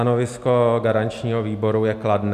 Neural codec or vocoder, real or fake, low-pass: none; real; 14.4 kHz